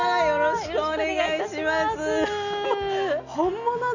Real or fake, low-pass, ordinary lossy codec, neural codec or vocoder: real; 7.2 kHz; none; none